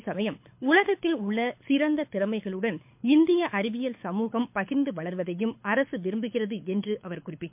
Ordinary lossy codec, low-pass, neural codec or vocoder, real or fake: MP3, 32 kbps; 3.6 kHz; codec, 24 kHz, 6 kbps, HILCodec; fake